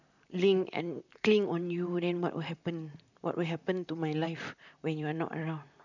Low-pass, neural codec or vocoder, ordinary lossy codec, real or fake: 7.2 kHz; vocoder, 44.1 kHz, 128 mel bands, Pupu-Vocoder; none; fake